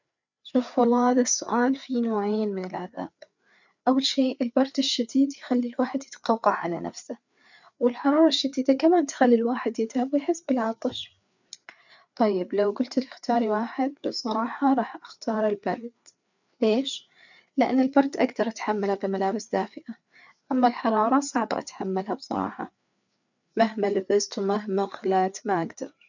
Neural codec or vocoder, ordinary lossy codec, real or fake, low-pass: codec, 16 kHz, 4 kbps, FreqCodec, larger model; none; fake; 7.2 kHz